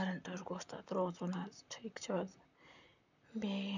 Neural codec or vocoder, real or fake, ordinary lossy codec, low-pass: vocoder, 44.1 kHz, 128 mel bands, Pupu-Vocoder; fake; none; 7.2 kHz